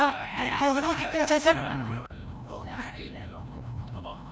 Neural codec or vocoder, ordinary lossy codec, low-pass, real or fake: codec, 16 kHz, 0.5 kbps, FreqCodec, larger model; none; none; fake